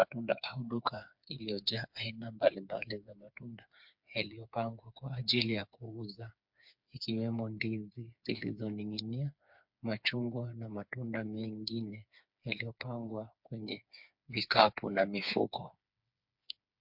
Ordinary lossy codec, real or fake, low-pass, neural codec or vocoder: MP3, 48 kbps; fake; 5.4 kHz; codec, 16 kHz, 4 kbps, FreqCodec, smaller model